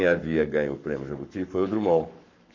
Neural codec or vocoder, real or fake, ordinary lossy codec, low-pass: codec, 44.1 kHz, 7.8 kbps, Pupu-Codec; fake; none; 7.2 kHz